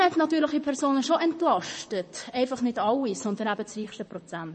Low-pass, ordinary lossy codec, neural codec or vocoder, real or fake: 10.8 kHz; MP3, 32 kbps; vocoder, 44.1 kHz, 128 mel bands, Pupu-Vocoder; fake